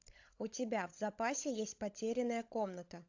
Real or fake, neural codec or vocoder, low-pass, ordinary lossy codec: fake; codec, 16 kHz, 16 kbps, FunCodec, trained on LibriTTS, 50 frames a second; 7.2 kHz; AAC, 48 kbps